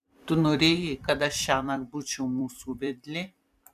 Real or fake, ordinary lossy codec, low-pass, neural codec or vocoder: fake; MP3, 96 kbps; 14.4 kHz; vocoder, 44.1 kHz, 128 mel bands every 256 samples, BigVGAN v2